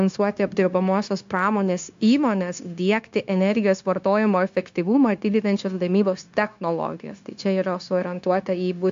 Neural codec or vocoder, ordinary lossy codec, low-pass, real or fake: codec, 16 kHz, 0.9 kbps, LongCat-Audio-Codec; AAC, 64 kbps; 7.2 kHz; fake